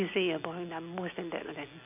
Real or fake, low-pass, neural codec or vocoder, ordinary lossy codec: real; 3.6 kHz; none; none